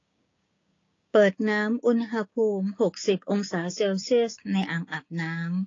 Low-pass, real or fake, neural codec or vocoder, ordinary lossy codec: 7.2 kHz; fake; codec, 16 kHz, 6 kbps, DAC; AAC, 32 kbps